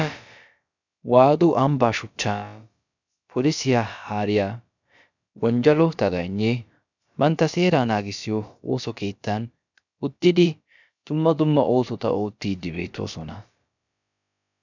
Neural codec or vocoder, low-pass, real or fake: codec, 16 kHz, about 1 kbps, DyCAST, with the encoder's durations; 7.2 kHz; fake